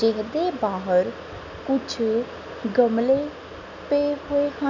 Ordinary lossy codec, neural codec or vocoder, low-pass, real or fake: none; none; 7.2 kHz; real